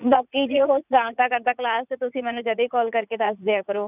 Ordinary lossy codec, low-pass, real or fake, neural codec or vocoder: none; 3.6 kHz; fake; vocoder, 44.1 kHz, 80 mel bands, Vocos